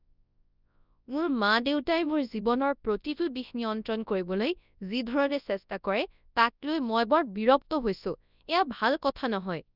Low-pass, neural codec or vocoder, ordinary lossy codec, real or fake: 5.4 kHz; codec, 24 kHz, 0.9 kbps, WavTokenizer, large speech release; none; fake